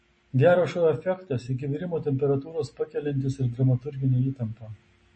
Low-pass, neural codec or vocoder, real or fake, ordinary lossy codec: 9.9 kHz; none; real; MP3, 32 kbps